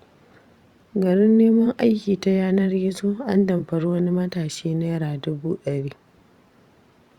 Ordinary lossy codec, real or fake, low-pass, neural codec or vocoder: Opus, 64 kbps; fake; 19.8 kHz; vocoder, 44.1 kHz, 128 mel bands every 512 samples, BigVGAN v2